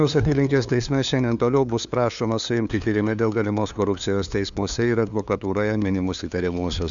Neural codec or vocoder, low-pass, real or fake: codec, 16 kHz, 2 kbps, FunCodec, trained on LibriTTS, 25 frames a second; 7.2 kHz; fake